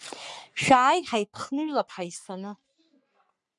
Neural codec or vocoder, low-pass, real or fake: codec, 44.1 kHz, 3.4 kbps, Pupu-Codec; 10.8 kHz; fake